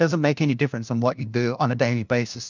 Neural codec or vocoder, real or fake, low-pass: codec, 16 kHz, 1 kbps, FunCodec, trained on LibriTTS, 50 frames a second; fake; 7.2 kHz